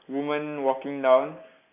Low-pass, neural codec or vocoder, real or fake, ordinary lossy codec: 3.6 kHz; none; real; none